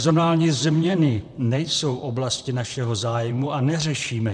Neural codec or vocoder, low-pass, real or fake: vocoder, 44.1 kHz, 128 mel bands, Pupu-Vocoder; 9.9 kHz; fake